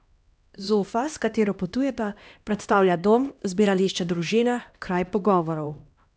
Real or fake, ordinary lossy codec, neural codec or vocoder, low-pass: fake; none; codec, 16 kHz, 1 kbps, X-Codec, HuBERT features, trained on LibriSpeech; none